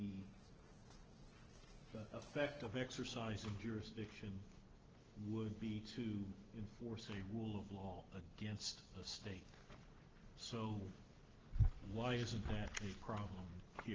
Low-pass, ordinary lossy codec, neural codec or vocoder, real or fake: 7.2 kHz; Opus, 16 kbps; none; real